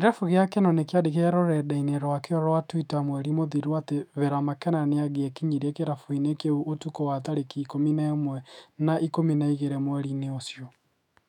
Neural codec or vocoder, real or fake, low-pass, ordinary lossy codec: autoencoder, 48 kHz, 128 numbers a frame, DAC-VAE, trained on Japanese speech; fake; 19.8 kHz; none